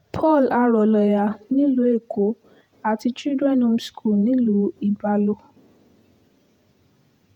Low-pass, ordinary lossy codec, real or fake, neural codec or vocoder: 19.8 kHz; none; fake; vocoder, 48 kHz, 128 mel bands, Vocos